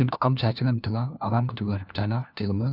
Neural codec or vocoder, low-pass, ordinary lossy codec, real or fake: codec, 16 kHz, 1 kbps, FunCodec, trained on LibriTTS, 50 frames a second; 5.4 kHz; none; fake